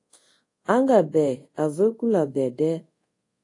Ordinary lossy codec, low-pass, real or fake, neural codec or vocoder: AAC, 48 kbps; 10.8 kHz; fake; codec, 24 kHz, 0.5 kbps, DualCodec